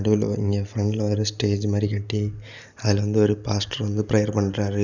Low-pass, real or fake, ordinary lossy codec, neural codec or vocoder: 7.2 kHz; real; none; none